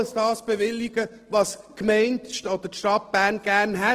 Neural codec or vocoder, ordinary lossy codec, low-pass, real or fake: none; Opus, 16 kbps; 14.4 kHz; real